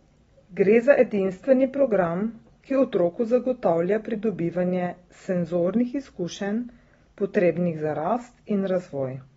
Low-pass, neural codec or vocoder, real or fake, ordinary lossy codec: 19.8 kHz; none; real; AAC, 24 kbps